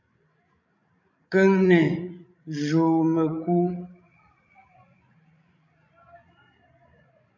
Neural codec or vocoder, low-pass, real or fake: codec, 16 kHz, 16 kbps, FreqCodec, larger model; 7.2 kHz; fake